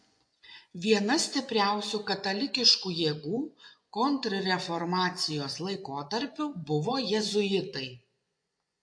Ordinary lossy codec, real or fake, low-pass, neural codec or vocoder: MP3, 48 kbps; fake; 9.9 kHz; vocoder, 24 kHz, 100 mel bands, Vocos